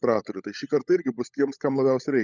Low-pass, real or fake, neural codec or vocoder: 7.2 kHz; fake; codec, 16 kHz, 16 kbps, FunCodec, trained on LibriTTS, 50 frames a second